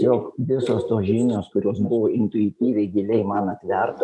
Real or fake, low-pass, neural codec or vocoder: real; 10.8 kHz; none